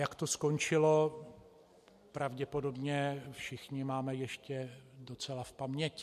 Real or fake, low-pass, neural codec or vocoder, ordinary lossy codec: real; 14.4 kHz; none; MP3, 64 kbps